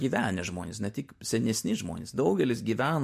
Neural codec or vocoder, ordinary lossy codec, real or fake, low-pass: none; MP3, 64 kbps; real; 14.4 kHz